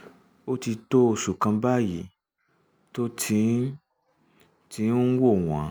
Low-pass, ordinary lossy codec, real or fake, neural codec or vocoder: none; none; real; none